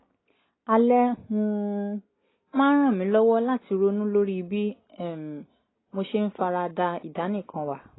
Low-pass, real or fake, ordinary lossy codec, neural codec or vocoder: 7.2 kHz; real; AAC, 16 kbps; none